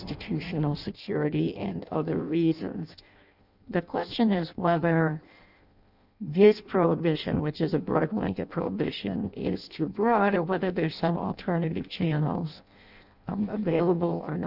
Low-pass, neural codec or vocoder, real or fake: 5.4 kHz; codec, 16 kHz in and 24 kHz out, 0.6 kbps, FireRedTTS-2 codec; fake